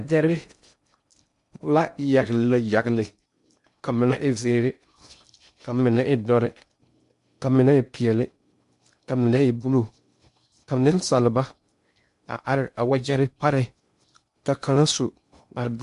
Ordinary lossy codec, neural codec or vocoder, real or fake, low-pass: MP3, 64 kbps; codec, 16 kHz in and 24 kHz out, 0.6 kbps, FocalCodec, streaming, 2048 codes; fake; 10.8 kHz